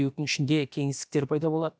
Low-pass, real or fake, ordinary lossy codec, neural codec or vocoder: none; fake; none; codec, 16 kHz, about 1 kbps, DyCAST, with the encoder's durations